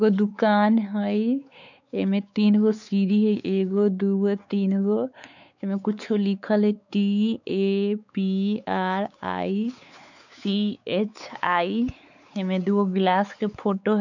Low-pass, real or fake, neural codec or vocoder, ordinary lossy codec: 7.2 kHz; fake; codec, 16 kHz, 4 kbps, X-Codec, WavLM features, trained on Multilingual LibriSpeech; none